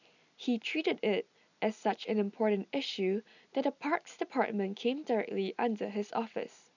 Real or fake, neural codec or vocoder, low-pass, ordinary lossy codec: real; none; 7.2 kHz; none